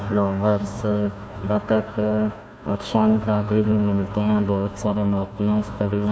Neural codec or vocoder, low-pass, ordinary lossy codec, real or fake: codec, 16 kHz, 1 kbps, FunCodec, trained on Chinese and English, 50 frames a second; none; none; fake